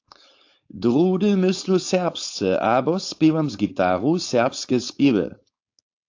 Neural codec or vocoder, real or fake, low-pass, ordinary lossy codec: codec, 16 kHz, 4.8 kbps, FACodec; fake; 7.2 kHz; AAC, 48 kbps